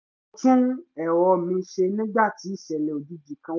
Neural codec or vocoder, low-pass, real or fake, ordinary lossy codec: none; 7.2 kHz; real; none